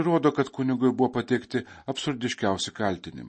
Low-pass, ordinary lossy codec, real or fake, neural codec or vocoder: 9.9 kHz; MP3, 32 kbps; real; none